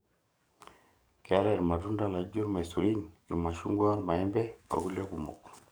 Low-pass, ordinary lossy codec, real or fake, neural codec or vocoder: none; none; fake; codec, 44.1 kHz, 7.8 kbps, DAC